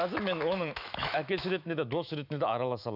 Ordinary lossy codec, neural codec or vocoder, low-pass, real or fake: none; autoencoder, 48 kHz, 128 numbers a frame, DAC-VAE, trained on Japanese speech; 5.4 kHz; fake